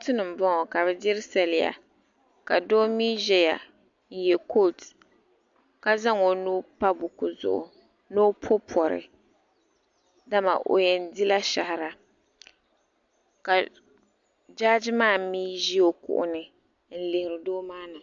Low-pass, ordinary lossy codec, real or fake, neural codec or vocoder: 7.2 kHz; MP3, 64 kbps; real; none